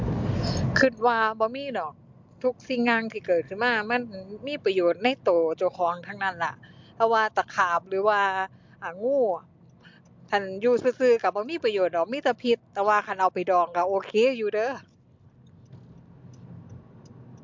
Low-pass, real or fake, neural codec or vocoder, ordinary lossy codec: 7.2 kHz; real; none; MP3, 64 kbps